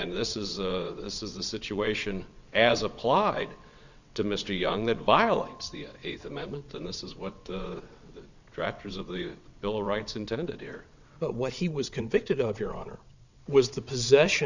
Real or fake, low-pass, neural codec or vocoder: fake; 7.2 kHz; vocoder, 22.05 kHz, 80 mel bands, WaveNeXt